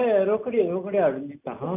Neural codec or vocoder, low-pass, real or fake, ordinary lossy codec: none; 3.6 kHz; real; none